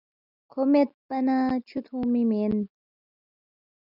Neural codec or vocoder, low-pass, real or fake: none; 5.4 kHz; real